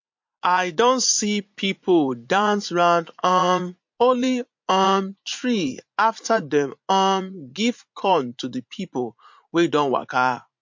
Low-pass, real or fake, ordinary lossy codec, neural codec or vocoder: 7.2 kHz; fake; MP3, 48 kbps; vocoder, 44.1 kHz, 80 mel bands, Vocos